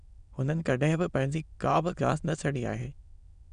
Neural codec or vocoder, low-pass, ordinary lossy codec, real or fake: autoencoder, 22.05 kHz, a latent of 192 numbers a frame, VITS, trained on many speakers; 9.9 kHz; none; fake